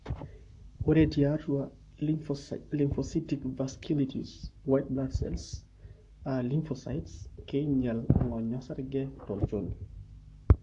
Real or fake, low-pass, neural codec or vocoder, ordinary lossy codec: fake; 10.8 kHz; codec, 44.1 kHz, 7.8 kbps, Pupu-Codec; AAC, 64 kbps